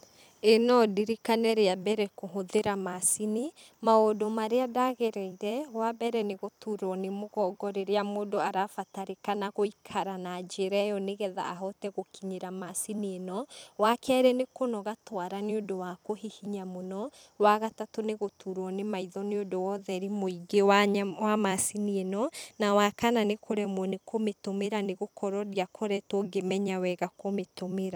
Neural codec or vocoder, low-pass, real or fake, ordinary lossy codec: vocoder, 44.1 kHz, 128 mel bands every 256 samples, BigVGAN v2; none; fake; none